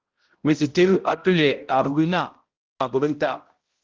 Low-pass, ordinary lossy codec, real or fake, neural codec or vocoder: 7.2 kHz; Opus, 16 kbps; fake; codec, 16 kHz, 0.5 kbps, X-Codec, HuBERT features, trained on balanced general audio